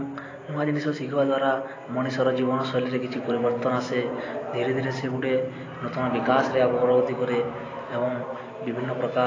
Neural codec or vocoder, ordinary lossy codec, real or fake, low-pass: none; AAC, 32 kbps; real; 7.2 kHz